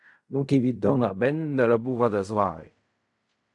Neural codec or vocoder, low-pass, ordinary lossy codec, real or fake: codec, 16 kHz in and 24 kHz out, 0.4 kbps, LongCat-Audio-Codec, fine tuned four codebook decoder; 10.8 kHz; AAC, 64 kbps; fake